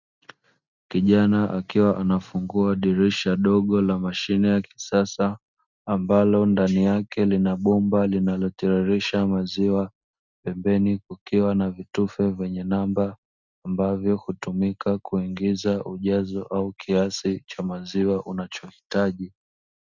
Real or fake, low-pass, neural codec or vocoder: real; 7.2 kHz; none